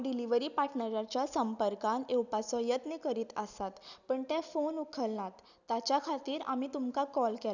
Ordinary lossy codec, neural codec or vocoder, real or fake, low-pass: none; none; real; 7.2 kHz